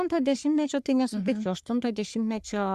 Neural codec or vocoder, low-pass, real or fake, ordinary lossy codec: codec, 44.1 kHz, 3.4 kbps, Pupu-Codec; 14.4 kHz; fake; Opus, 64 kbps